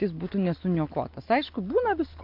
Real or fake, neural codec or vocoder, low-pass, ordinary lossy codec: real; none; 5.4 kHz; MP3, 48 kbps